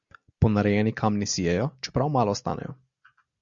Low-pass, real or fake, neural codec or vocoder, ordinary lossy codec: 7.2 kHz; real; none; Opus, 64 kbps